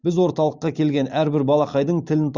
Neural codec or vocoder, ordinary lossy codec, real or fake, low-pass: none; Opus, 64 kbps; real; 7.2 kHz